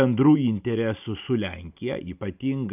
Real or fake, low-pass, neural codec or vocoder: real; 3.6 kHz; none